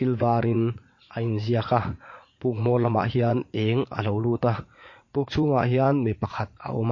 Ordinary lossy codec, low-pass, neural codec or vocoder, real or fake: MP3, 32 kbps; 7.2 kHz; vocoder, 44.1 kHz, 80 mel bands, Vocos; fake